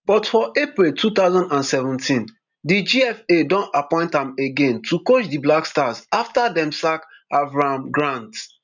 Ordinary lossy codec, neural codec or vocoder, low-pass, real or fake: none; none; 7.2 kHz; real